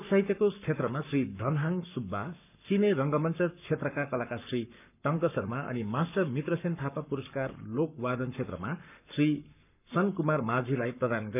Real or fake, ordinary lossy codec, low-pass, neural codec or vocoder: fake; none; 3.6 kHz; codec, 44.1 kHz, 7.8 kbps, Pupu-Codec